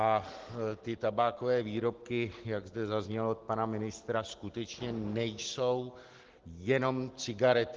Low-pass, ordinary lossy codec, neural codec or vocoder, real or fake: 7.2 kHz; Opus, 16 kbps; none; real